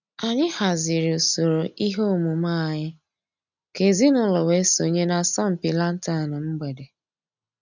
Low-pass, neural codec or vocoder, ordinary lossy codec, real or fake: 7.2 kHz; none; none; real